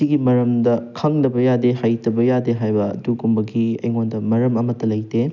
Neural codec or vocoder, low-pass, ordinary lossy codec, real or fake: none; 7.2 kHz; none; real